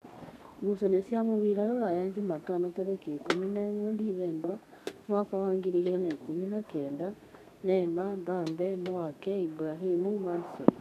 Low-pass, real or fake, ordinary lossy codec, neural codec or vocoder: 14.4 kHz; fake; none; codec, 32 kHz, 1.9 kbps, SNAC